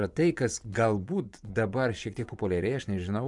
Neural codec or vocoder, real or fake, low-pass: vocoder, 24 kHz, 100 mel bands, Vocos; fake; 10.8 kHz